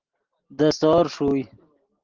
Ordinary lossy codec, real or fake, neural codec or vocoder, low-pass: Opus, 16 kbps; real; none; 7.2 kHz